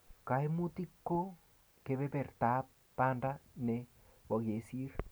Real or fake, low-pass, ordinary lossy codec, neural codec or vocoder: real; none; none; none